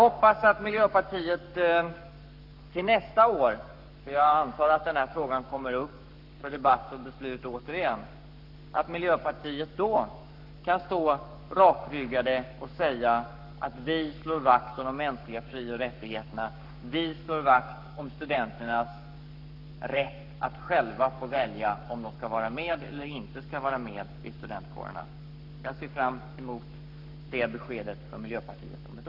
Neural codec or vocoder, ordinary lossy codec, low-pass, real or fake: codec, 44.1 kHz, 7.8 kbps, Pupu-Codec; none; 5.4 kHz; fake